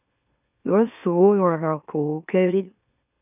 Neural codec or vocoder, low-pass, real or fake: autoencoder, 44.1 kHz, a latent of 192 numbers a frame, MeloTTS; 3.6 kHz; fake